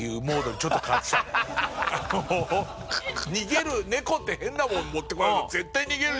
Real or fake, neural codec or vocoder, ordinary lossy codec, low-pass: real; none; none; none